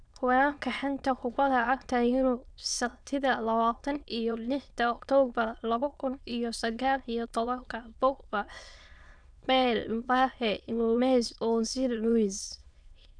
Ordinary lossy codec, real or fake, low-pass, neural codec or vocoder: none; fake; 9.9 kHz; autoencoder, 22.05 kHz, a latent of 192 numbers a frame, VITS, trained on many speakers